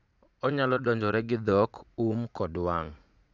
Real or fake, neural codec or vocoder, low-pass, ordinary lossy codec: fake; vocoder, 44.1 kHz, 80 mel bands, Vocos; 7.2 kHz; none